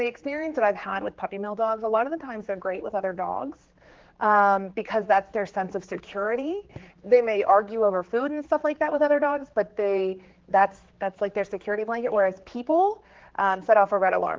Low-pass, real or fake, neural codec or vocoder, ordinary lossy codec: 7.2 kHz; fake; codec, 16 kHz, 4 kbps, X-Codec, HuBERT features, trained on general audio; Opus, 16 kbps